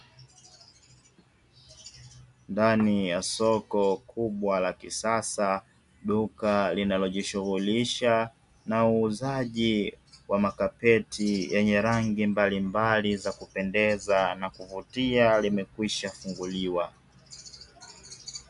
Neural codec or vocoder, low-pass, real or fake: none; 10.8 kHz; real